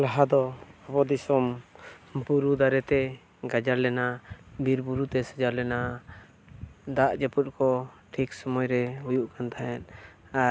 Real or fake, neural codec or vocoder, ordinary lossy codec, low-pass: real; none; none; none